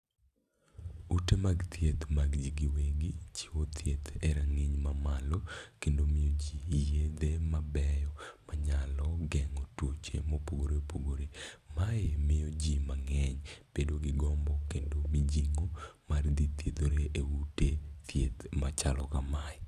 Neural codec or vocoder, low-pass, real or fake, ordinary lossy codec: none; 14.4 kHz; real; none